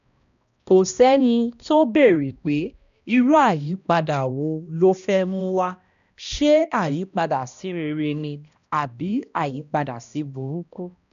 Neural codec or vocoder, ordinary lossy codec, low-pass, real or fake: codec, 16 kHz, 1 kbps, X-Codec, HuBERT features, trained on balanced general audio; AAC, 96 kbps; 7.2 kHz; fake